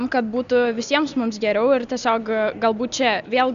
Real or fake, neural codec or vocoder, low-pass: real; none; 7.2 kHz